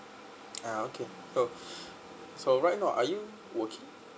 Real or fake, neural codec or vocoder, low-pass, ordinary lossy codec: real; none; none; none